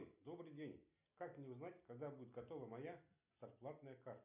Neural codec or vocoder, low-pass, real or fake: none; 3.6 kHz; real